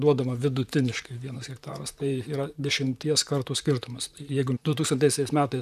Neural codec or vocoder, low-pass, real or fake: vocoder, 44.1 kHz, 128 mel bands, Pupu-Vocoder; 14.4 kHz; fake